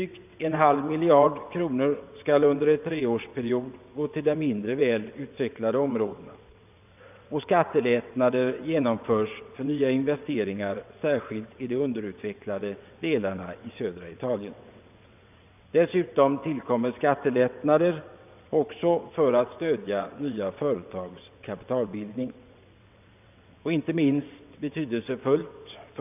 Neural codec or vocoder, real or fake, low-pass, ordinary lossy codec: vocoder, 22.05 kHz, 80 mel bands, WaveNeXt; fake; 3.6 kHz; none